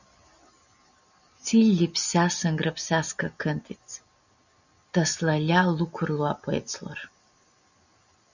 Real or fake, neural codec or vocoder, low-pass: real; none; 7.2 kHz